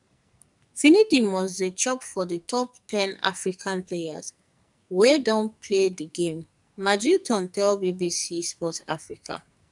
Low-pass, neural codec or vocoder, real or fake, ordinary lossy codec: 10.8 kHz; codec, 44.1 kHz, 2.6 kbps, SNAC; fake; none